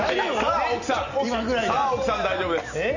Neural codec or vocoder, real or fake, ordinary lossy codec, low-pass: none; real; none; 7.2 kHz